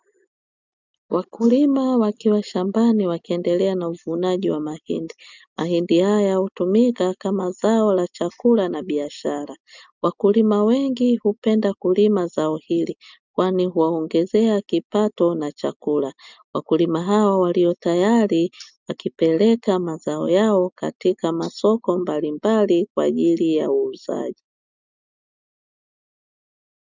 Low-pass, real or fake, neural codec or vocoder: 7.2 kHz; real; none